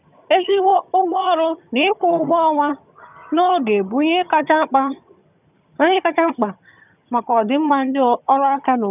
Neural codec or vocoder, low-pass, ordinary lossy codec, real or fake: vocoder, 22.05 kHz, 80 mel bands, HiFi-GAN; 3.6 kHz; none; fake